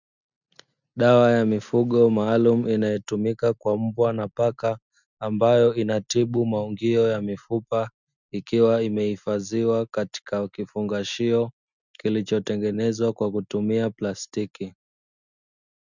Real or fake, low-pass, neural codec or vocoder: real; 7.2 kHz; none